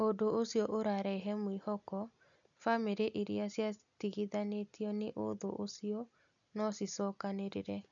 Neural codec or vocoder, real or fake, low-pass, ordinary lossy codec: none; real; 7.2 kHz; none